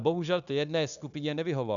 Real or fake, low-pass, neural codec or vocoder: fake; 7.2 kHz; codec, 16 kHz, 0.9 kbps, LongCat-Audio-Codec